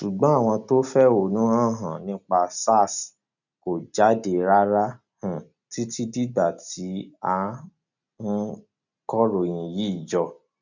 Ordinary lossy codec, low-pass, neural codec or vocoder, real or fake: none; 7.2 kHz; none; real